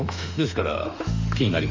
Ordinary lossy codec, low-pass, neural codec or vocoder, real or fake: MP3, 64 kbps; 7.2 kHz; autoencoder, 48 kHz, 32 numbers a frame, DAC-VAE, trained on Japanese speech; fake